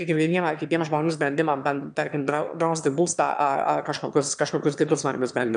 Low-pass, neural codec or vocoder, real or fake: 9.9 kHz; autoencoder, 22.05 kHz, a latent of 192 numbers a frame, VITS, trained on one speaker; fake